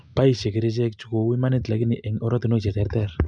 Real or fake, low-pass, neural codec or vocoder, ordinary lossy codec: real; 9.9 kHz; none; none